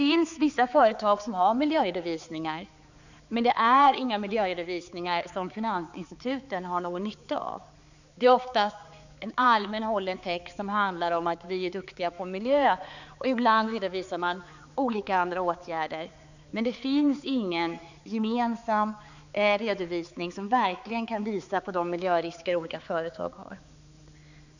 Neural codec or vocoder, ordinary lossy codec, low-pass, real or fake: codec, 16 kHz, 4 kbps, X-Codec, HuBERT features, trained on balanced general audio; none; 7.2 kHz; fake